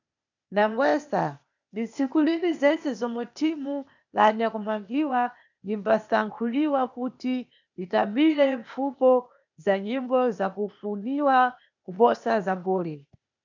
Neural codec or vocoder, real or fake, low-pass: codec, 16 kHz, 0.8 kbps, ZipCodec; fake; 7.2 kHz